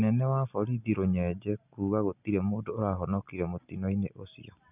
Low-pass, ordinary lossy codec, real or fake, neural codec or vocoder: 3.6 kHz; none; real; none